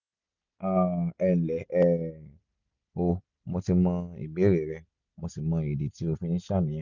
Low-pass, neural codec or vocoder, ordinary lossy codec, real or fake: 7.2 kHz; none; none; real